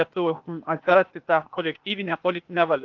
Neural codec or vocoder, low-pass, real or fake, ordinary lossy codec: codec, 16 kHz, 0.8 kbps, ZipCodec; 7.2 kHz; fake; Opus, 24 kbps